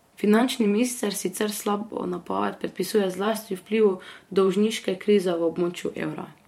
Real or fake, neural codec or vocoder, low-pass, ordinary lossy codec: fake; vocoder, 44.1 kHz, 128 mel bands every 512 samples, BigVGAN v2; 19.8 kHz; MP3, 64 kbps